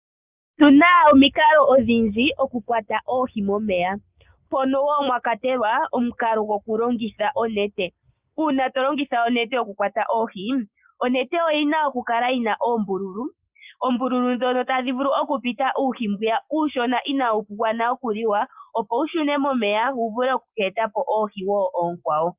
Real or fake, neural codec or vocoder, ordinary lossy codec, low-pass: real; none; Opus, 24 kbps; 3.6 kHz